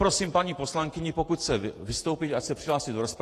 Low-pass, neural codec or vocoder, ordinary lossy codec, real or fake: 14.4 kHz; vocoder, 48 kHz, 128 mel bands, Vocos; AAC, 48 kbps; fake